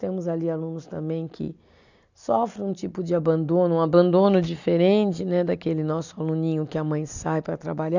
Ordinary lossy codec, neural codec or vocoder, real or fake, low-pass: none; none; real; 7.2 kHz